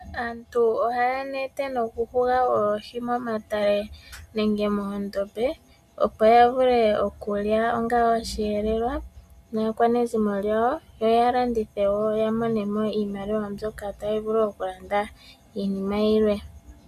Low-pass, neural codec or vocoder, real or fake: 14.4 kHz; none; real